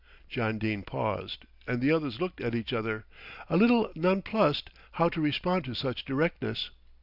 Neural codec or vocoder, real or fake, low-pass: none; real; 5.4 kHz